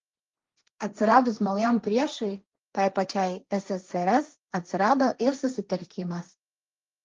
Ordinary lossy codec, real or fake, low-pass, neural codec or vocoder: Opus, 32 kbps; fake; 7.2 kHz; codec, 16 kHz, 1.1 kbps, Voila-Tokenizer